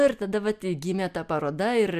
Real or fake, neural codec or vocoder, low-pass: real; none; 14.4 kHz